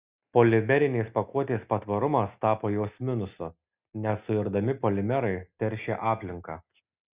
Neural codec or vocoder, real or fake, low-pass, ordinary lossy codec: none; real; 3.6 kHz; Opus, 64 kbps